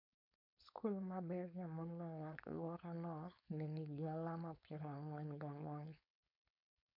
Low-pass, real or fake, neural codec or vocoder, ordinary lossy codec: 5.4 kHz; fake; codec, 16 kHz, 4.8 kbps, FACodec; MP3, 48 kbps